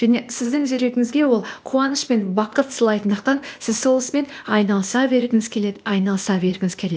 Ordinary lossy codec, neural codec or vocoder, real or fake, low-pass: none; codec, 16 kHz, 0.8 kbps, ZipCodec; fake; none